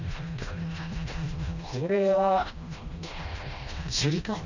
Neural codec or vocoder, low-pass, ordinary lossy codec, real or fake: codec, 16 kHz, 1 kbps, FreqCodec, smaller model; 7.2 kHz; none; fake